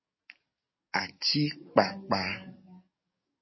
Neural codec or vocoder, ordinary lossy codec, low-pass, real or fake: codec, 44.1 kHz, 7.8 kbps, DAC; MP3, 24 kbps; 7.2 kHz; fake